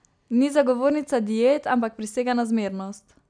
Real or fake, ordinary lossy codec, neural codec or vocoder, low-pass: real; none; none; 9.9 kHz